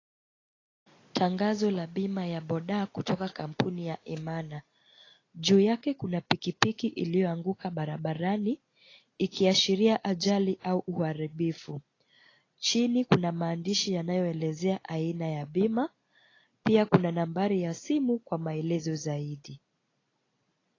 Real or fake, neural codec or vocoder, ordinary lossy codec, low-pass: real; none; AAC, 32 kbps; 7.2 kHz